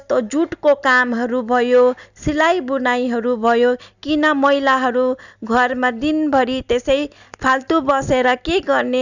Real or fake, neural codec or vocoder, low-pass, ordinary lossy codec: real; none; 7.2 kHz; none